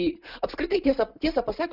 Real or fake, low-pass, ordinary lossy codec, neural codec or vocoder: real; 5.4 kHz; Opus, 64 kbps; none